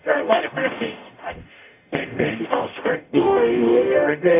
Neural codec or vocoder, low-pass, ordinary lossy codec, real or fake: codec, 44.1 kHz, 0.9 kbps, DAC; 3.6 kHz; AAC, 32 kbps; fake